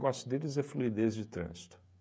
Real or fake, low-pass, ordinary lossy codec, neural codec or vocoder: fake; none; none; codec, 16 kHz, 16 kbps, FreqCodec, smaller model